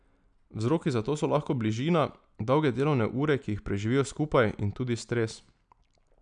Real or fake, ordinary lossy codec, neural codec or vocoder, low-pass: real; none; none; 9.9 kHz